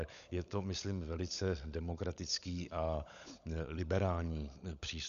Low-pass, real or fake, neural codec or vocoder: 7.2 kHz; fake; codec, 16 kHz, 16 kbps, FunCodec, trained on LibriTTS, 50 frames a second